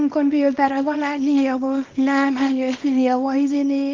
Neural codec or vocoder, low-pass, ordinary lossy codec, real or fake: codec, 24 kHz, 0.9 kbps, WavTokenizer, small release; 7.2 kHz; Opus, 32 kbps; fake